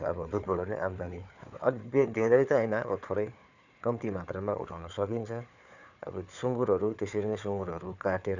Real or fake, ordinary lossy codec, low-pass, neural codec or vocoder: fake; none; 7.2 kHz; codec, 16 kHz, 4 kbps, FunCodec, trained on Chinese and English, 50 frames a second